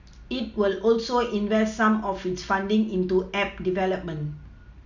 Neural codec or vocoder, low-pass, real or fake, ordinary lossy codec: none; 7.2 kHz; real; none